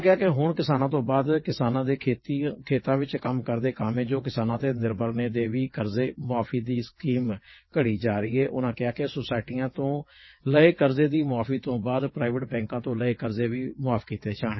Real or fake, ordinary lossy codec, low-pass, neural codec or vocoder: fake; MP3, 24 kbps; 7.2 kHz; vocoder, 22.05 kHz, 80 mel bands, WaveNeXt